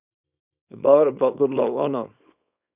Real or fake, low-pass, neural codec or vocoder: fake; 3.6 kHz; codec, 24 kHz, 0.9 kbps, WavTokenizer, small release